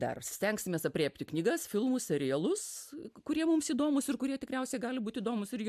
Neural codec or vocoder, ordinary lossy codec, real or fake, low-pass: none; MP3, 96 kbps; real; 14.4 kHz